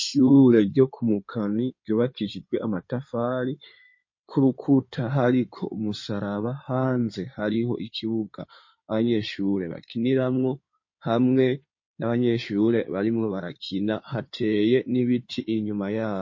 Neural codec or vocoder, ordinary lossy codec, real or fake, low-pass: codec, 16 kHz in and 24 kHz out, 2.2 kbps, FireRedTTS-2 codec; MP3, 32 kbps; fake; 7.2 kHz